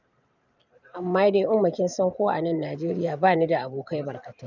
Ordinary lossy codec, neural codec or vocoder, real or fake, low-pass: none; vocoder, 44.1 kHz, 128 mel bands every 512 samples, BigVGAN v2; fake; 7.2 kHz